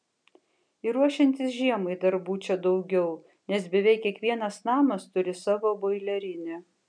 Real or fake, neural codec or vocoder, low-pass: real; none; 9.9 kHz